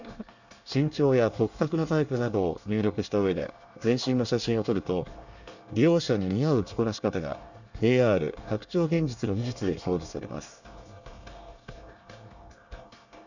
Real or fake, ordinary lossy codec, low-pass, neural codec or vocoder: fake; none; 7.2 kHz; codec, 24 kHz, 1 kbps, SNAC